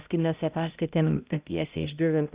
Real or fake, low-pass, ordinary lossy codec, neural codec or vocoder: fake; 3.6 kHz; Opus, 64 kbps; codec, 16 kHz, 0.5 kbps, X-Codec, HuBERT features, trained on balanced general audio